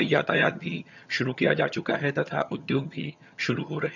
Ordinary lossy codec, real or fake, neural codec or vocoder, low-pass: none; fake; vocoder, 22.05 kHz, 80 mel bands, HiFi-GAN; 7.2 kHz